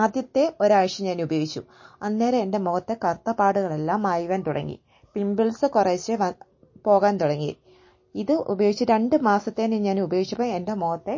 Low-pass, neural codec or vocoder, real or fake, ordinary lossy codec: 7.2 kHz; none; real; MP3, 32 kbps